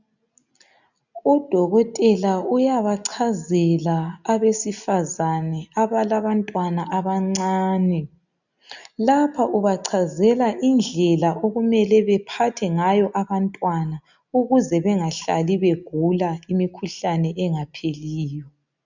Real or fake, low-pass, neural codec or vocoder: real; 7.2 kHz; none